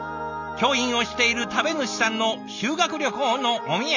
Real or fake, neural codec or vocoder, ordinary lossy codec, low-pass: real; none; none; 7.2 kHz